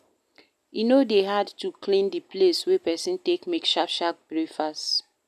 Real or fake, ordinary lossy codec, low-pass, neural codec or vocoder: real; none; 14.4 kHz; none